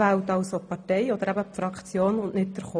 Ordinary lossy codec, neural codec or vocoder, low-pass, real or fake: none; none; 9.9 kHz; real